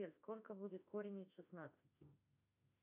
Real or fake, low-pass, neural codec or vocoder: fake; 3.6 kHz; autoencoder, 48 kHz, 32 numbers a frame, DAC-VAE, trained on Japanese speech